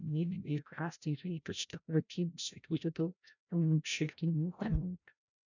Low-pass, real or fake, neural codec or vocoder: 7.2 kHz; fake; codec, 16 kHz, 0.5 kbps, FreqCodec, larger model